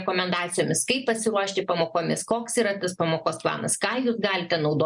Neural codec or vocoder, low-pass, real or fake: none; 10.8 kHz; real